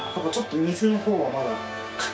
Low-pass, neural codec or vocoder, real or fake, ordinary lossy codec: none; codec, 16 kHz, 6 kbps, DAC; fake; none